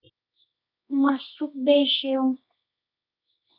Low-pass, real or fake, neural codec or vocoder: 5.4 kHz; fake; codec, 24 kHz, 0.9 kbps, WavTokenizer, medium music audio release